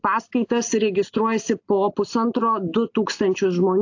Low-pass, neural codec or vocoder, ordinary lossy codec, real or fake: 7.2 kHz; none; AAC, 48 kbps; real